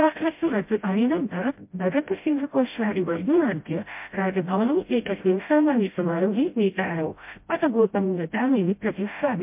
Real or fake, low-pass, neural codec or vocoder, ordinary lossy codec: fake; 3.6 kHz; codec, 16 kHz, 0.5 kbps, FreqCodec, smaller model; MP3, 32 kbps